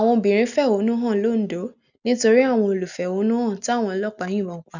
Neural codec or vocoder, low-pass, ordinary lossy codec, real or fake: none; 7.2 kHz; none; real